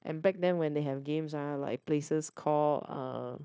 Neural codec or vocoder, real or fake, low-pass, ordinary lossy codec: codec, 16 kHz, 0.9 kbps, LongCat-Audio-Codec; fake; none; none